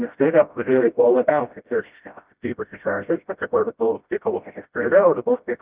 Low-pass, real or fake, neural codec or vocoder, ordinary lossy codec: 3.6 kHz; fake; codec, 16 kHz, 0.5 kbps, FreqCodec, smaller model; Opus, 32 kbps